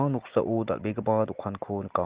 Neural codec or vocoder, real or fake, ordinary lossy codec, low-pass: none; real; Opus, 16 kbps; 3.6 kHz